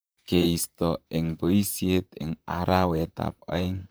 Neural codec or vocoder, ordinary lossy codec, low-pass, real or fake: vocoder, 44.1 kHz, 128 mel bands, Pupu-Vocoder; none; none; fake